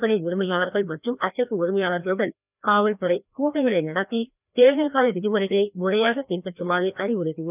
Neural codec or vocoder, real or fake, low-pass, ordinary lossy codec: codec, 16 kHz, 1 kbps, FreqCodec, larger model; fake; 3.6 kHz; none